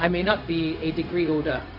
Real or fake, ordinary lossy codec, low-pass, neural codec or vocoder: fake; none; 5.4 kHz; codec, 16 kHz, 0.4 kbps, LongCat-Audio-Codec